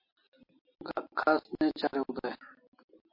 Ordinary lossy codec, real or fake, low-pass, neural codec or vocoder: MP3, 32 kbps; real; 5.4 kHz; none